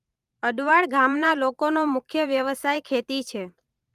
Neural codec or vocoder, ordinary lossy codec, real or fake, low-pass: vocoder, 44.1 kHz, 128 mel bands every 512 samples, BigVGAN v2; Opus, 32 kbps; fake; 14.4 kHz